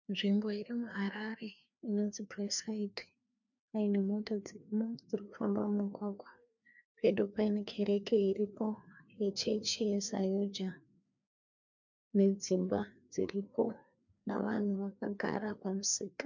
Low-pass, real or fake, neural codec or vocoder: 7.2 kHz; fake; codec, 16 kHz, 2 kbps, FreqCodec, larger model